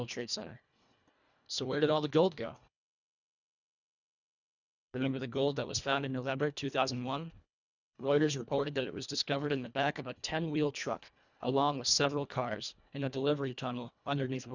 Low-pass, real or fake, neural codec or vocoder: 7.2 kHz; fake; codec, 24 kHz, 1.5 kbps, HILCodec